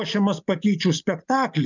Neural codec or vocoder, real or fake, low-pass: none; real; 7.2 kHz